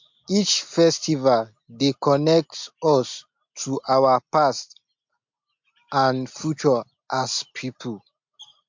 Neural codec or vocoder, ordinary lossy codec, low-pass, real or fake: none; MP3, 48 kbps; 7.2 kHz; real